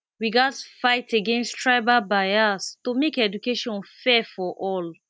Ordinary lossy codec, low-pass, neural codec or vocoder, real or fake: none; none; none; real